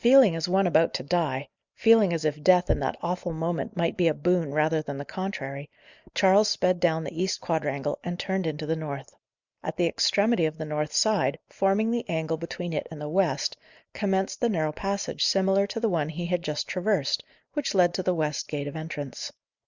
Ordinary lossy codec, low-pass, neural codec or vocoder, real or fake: Opus, 64 kbps; 7.2 kHz; none; real